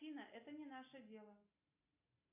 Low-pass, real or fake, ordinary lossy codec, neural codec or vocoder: 3.6 kHz; real; MP3, 24 kbps; none